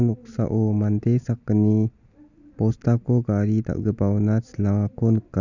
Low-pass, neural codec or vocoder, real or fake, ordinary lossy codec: 7.2 kHz; none; real; none